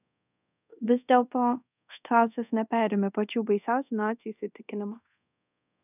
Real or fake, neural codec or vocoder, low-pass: fake; codec, 24 kHz, 0.5 kbps, DualCodec; 3.6 kHz